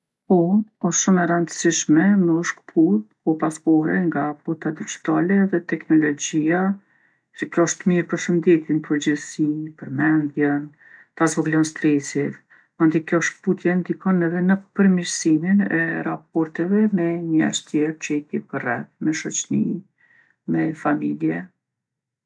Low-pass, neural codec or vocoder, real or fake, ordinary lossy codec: none; none; real; none